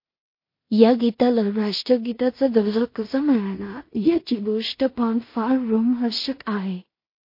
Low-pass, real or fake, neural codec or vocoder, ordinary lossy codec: 5.4 kHz; fake; codec, 16 kHz in and 24 kHz out, 0.4 kbps, LongCat-Audio-Codec, two codebook decoder; MP3, 32 kbps